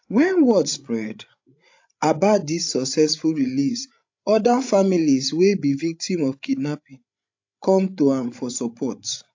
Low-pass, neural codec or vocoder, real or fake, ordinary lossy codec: 7.2 kHz; codec, 16 kHz, 16 kbps, FreqCodec, larger model; fake; AAC, 48 kbps